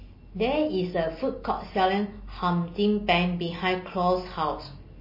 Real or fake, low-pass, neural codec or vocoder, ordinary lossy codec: real; 5.4 kHz; none; MP3, 24 kbps